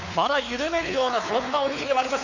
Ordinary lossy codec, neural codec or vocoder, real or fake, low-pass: none; codec, 16 kHz, 4 kbps, X-Codec, WavLM features, trained on Multilingual LibriSpeech; fake; 7.2 kHz